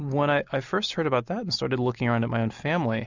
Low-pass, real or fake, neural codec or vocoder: 7.2 kHz; real; none